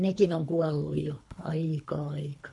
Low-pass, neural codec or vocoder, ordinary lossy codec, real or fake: 10.8 kHz; codec, 24 kHz, 3 kbps, HILCodec; none; fake